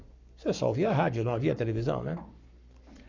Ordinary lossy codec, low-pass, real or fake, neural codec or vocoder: none; 7.2 kHz; fake; codec, 44.1 kHz, 7.8 kbps, Pupu-Codec